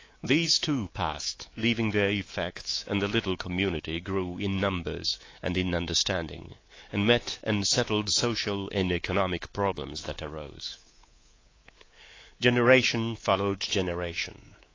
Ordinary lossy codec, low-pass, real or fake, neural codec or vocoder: AAC, 32 kbps; 7.2 kHz; fake; codec, 24 kHz, 3.1 kbps, DualCodec